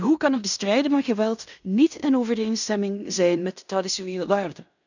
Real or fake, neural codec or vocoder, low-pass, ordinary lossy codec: fake; codec, 16 kHz in and 24 kHz out, 0.9 kbps, LongCat-Audio-Codec, fine tuned four codebook decoder; 7.2 kHz; none